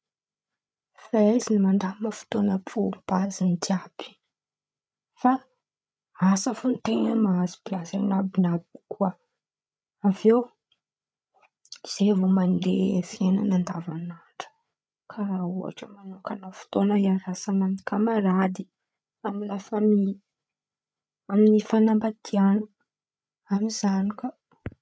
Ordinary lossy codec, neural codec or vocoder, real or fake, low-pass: none; codec, 16 kHz, 8 kbps, FreqCodec, larger model; fake; none